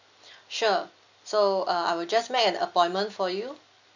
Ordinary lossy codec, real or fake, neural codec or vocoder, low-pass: none; real; none; 7.2 kHz